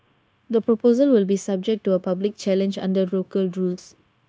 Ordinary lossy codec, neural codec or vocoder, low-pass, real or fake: none; codec, 16 kHz, 0.9 kbps, LongCat-Audio-Codec; none; fake